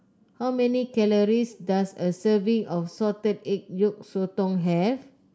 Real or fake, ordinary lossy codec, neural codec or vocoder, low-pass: real; none; none; none